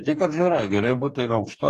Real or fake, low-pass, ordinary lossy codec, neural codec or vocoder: fake; 14.4 kHz; AAC, 48 kbps; codec, 44.1 kHz, 2.6 kbps, DAC